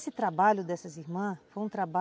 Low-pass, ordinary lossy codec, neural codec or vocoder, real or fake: none; none; none; real